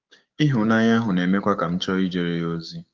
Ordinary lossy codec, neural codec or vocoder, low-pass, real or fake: Opus, 32 kbps; codec, 16 kHz, 6 kbps, DAC; 7.2 kHz; fake